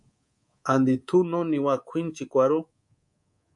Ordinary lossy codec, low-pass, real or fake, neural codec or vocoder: MP3, 64 kbps; 10.8 kHz; fake; codec, 24 kHz, 3.1 kbps, DualCodec